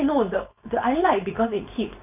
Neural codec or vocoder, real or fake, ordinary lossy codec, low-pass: codec, 16 kHz, 4.8 kbps, FACodec; fake; none; 3.6 kHz